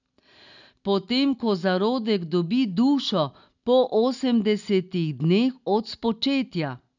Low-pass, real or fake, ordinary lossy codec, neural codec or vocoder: 7.2 kHz; real; none; none